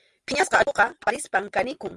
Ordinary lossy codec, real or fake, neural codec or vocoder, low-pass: Opus, 32 kbps; real; none; 10.8 kHz